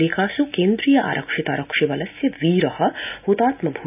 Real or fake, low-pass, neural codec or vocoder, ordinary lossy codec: real; 3.6 kHz; none; none